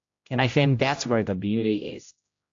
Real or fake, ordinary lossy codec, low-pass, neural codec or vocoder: fake; AAC, 48 kbps; 7.2 kHz; codec, 16 kHz, 0.5 kbps, X-Codec, HuBERT features, trained on general audio